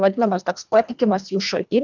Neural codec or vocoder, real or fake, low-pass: codec, 24 kHz, 1.5 kbps, HILCodec; fake; 7.2 kHz